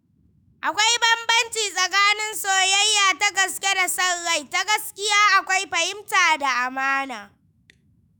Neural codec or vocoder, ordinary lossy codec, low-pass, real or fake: autoencoder, 48 kHz, 128 numbers a frame, DAC-VAE, trained on Japanese speech; none; none; fake